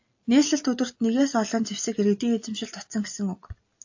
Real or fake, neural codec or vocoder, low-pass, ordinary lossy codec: real; none; 7.2 kHz; AAC, 48 kbps